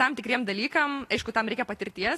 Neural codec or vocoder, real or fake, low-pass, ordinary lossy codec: none; real; 14.4 kHz; AAC, 48 kbps